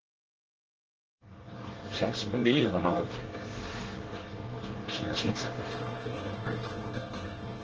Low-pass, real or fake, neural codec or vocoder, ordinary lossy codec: 7.2 kHz; fake; codec, 24 kHz, 1 kbps, SNAC; Opus, 16 kbps